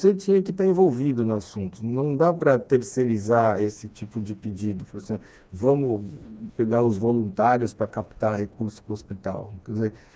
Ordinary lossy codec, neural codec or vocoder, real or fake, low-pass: none; codec, 16 kHz, 2 kbps, FreqCodec, smaller model; fake; none